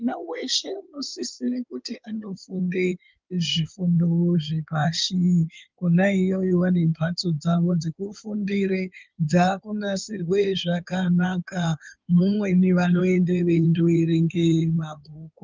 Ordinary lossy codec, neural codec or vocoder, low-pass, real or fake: Opus, 32 kbps; codec, 16 kHz in and 24 kHz out, 2.2 kbps, FireRedTTS-2 codec; 7.2 kHz; fake